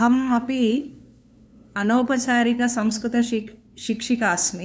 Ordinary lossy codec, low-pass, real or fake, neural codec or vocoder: none; none; fake; codec, 16 kHz, 2 kbps, FunCodec, trained on LibriTTS, 25 frames a second